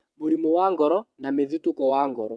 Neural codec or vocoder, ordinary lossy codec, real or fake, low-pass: vocoder, 22.05 kHz, 80 mel bands, WaveNeXt; none; fake; none